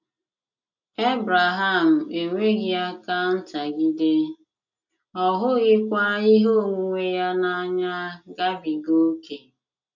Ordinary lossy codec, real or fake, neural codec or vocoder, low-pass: none; real; none; 7.2 kHz